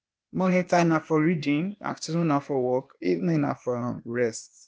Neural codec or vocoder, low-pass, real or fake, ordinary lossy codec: codec, 16 kHz, 0.8 kbps, ZipCodec; none; fake; none